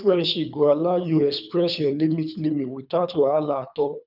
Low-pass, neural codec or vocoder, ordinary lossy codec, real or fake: 5.4 kHz; codec, 24 kHz, 3 kbps, HILCodec; none; fake